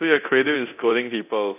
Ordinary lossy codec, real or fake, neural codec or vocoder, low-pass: AAC, 32 kbps; fake; codec, 24 kHz, 0.9 kbps, DualCodec; 3.6 kHz